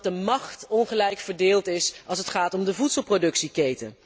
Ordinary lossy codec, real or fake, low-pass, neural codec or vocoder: none; real; none; none